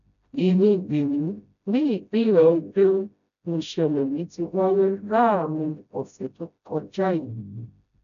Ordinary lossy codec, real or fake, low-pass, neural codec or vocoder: none; fake; 7.2 kHz; codec, 16 kHz, 0.5 kbps, FreqCodec, smaller model